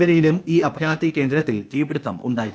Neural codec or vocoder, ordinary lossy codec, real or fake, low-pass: codec, 16 kHz, 0.8 kbps, ZipCodec; none; fake; none